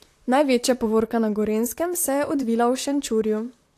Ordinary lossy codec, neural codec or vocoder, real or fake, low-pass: AAC, 64 kbps; vocoder, 44.1 kHz, 128 mel bands, Pupu-Vocoder; fake; 14.4 kHz